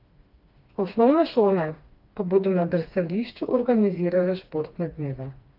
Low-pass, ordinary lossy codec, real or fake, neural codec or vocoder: 5.4 kHz; Opus, 32 kbps; fake; codec, 16 kHz, 2 kbps, FreqCodec, smaller model